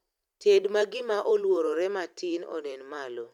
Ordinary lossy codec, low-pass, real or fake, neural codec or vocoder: none; 19.8 kHz; fake; vocoder, 44.1 kHz, 128 mel bands every 512 samples, BigVGAN v2